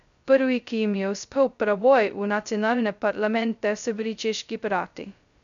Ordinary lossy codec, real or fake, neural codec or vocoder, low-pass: none; fake; codec, 16 kHz, 0.2 kbps, FocalCodec; 7.2 kHz